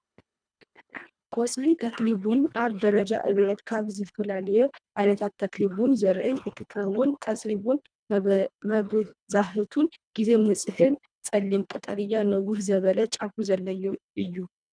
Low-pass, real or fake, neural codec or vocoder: 9.9 kHz; fake; codec, 24 kHz, 1.5 kbps, HILCodec